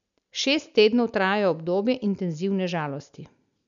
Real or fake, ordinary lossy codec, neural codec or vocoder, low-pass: real; none; none; 7.2 kHz